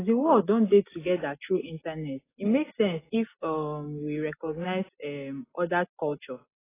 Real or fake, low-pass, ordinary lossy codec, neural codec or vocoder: real; 3.6 kHz; AAC, 16 kbps; none